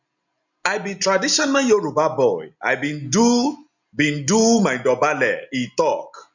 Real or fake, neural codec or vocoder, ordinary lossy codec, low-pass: real; none; none; 7.2 kHz